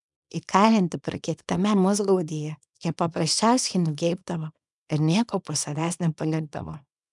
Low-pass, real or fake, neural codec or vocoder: 10.8 kHz; fake; codec, 24 kHz, 0.9 kbps, WavTokenizer, small release